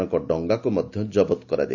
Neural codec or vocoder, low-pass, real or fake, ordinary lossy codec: none; 7.2 kHz; real; none